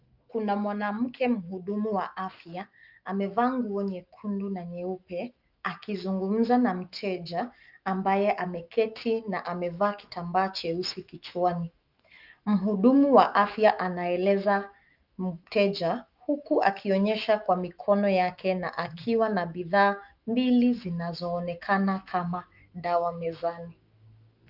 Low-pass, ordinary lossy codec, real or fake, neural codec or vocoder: 5.4 kHz; Opus, 24 kbps; fake; autoencoder, 48 kHz, 128 numbers a frame, DAC-VAE, trained on Japanese speech